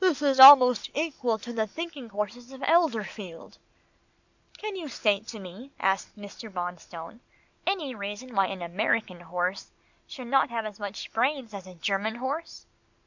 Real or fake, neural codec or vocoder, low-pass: real; none; 7.2 kHz